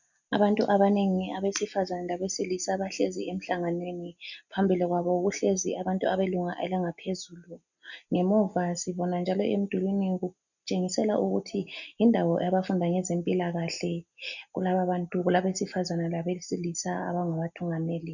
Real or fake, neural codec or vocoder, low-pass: real; none; 7.2 kHz